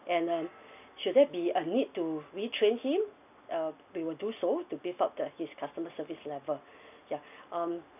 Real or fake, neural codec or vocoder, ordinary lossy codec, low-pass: real; none; none; 3.6 kHz